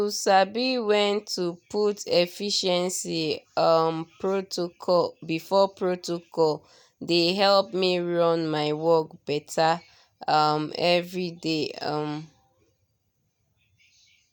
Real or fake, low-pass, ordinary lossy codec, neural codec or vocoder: real; 19.8 kHz; none; none